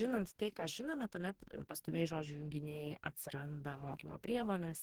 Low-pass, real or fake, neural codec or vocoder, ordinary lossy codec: 19.8 kHz; fake; codec, 44.1 kHz, 2.6 kbps, DAC; Opus, 16 kbps